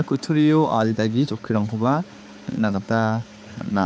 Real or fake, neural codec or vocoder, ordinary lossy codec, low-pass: fake; codec, 16 kHz, 4 kbps, X-Codec, HuBERT features, trained on balanced general audio; none; none